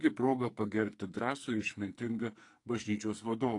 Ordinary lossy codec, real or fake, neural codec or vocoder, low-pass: AAC, 48 kbps; fake; codec, 44.1 kHz, 2.6 kbps, SNAC; 10.8 kHz